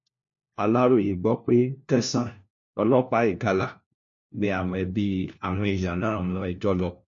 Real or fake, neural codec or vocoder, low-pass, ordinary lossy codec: fake; codec, 16 kHz, 1 kbps, FunCodec, trained on LibriTTS, 50 frames a second; 7.2 kHz; MP3, 48 kbps